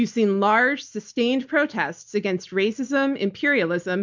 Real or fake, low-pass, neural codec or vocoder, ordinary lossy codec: real; 7.2 kHz; none; MP3, 64 kbps